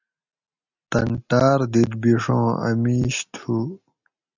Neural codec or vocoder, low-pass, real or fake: none; 7.2 kHz; real